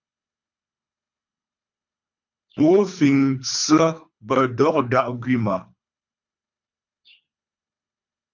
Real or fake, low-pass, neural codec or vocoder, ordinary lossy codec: fake; 7.2 kHz; codec, 24 kHz, 3 kbps, HILCodec; MP3, 64 kbps